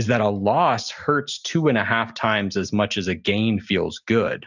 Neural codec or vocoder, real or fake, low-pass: none; real; 7.2 kHz